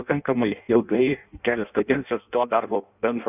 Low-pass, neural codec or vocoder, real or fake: 3.6 kHz; codec, 16 kHz in and 24 kHz out, 0.6 kbps, FireRedTTS-2 codec; fake